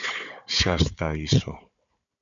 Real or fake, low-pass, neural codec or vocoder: fake; 7.2 kHz; codec, 16 kHz, 4 kbps, FunCodec, trained on Chinese and English, 50 frames a second